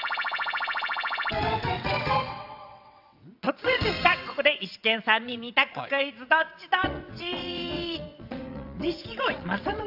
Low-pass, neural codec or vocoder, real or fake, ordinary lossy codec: 5.4 kHz; vocoder, 22.05 kHz, 80 mel bands, WaveNeXt; fake; AAC, 48 kbps